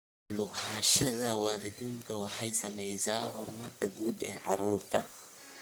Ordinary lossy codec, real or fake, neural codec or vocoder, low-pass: none; fake; codec, 44.1 kHz, 1.7 kbps, Pupu-Codec; none